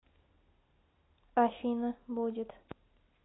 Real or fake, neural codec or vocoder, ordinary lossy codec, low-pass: real; none; AAC, 16 kbps; 7.2 kHz